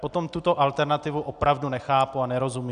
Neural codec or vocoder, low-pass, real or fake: none; 9.9 kHz; real